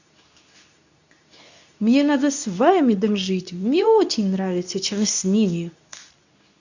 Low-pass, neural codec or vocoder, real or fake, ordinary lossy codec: 7.2 kHz; codec, 24 kHz, 0.9 kbps, WavTokenizer, medium speech release version 2; fake; none